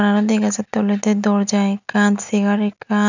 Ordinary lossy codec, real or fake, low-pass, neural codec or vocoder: none; real; 7.2 kHz; none